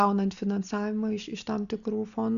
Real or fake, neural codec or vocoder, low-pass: real; none; 7.2 kHz